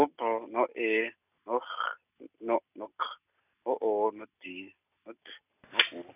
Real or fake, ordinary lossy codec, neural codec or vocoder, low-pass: real; none; none; 3.6 kHz